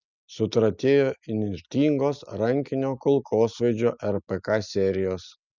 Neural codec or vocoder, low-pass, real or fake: none; 7.2 kHz; real